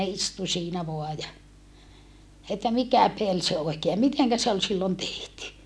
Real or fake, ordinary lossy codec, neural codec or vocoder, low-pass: real; none; none; none